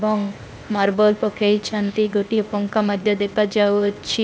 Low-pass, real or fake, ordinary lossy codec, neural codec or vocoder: none; fake; none; codec, 16 kHz, 0.8 kbps, ZipCodec